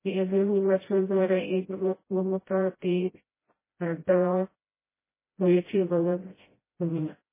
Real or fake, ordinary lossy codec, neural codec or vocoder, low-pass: fake; MP3, 16 kbps; codec, 16 kHz, 0.5 kbps, FreqCodec, smaller model; 3.6 kHz